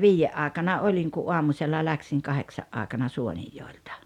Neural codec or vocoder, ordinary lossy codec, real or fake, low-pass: none; none; real; 19.8 kHz